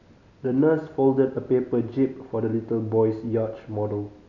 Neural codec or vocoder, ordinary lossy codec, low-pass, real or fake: none; none; 7.2 kHz; real